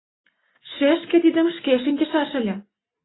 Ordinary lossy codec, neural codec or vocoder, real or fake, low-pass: AAC, 16 kbps; none; real; 7.2 kHz